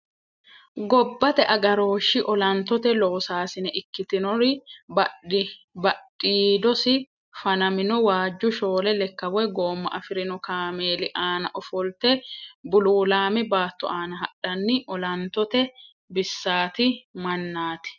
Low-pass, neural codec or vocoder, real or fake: 7.2 kHz; none; real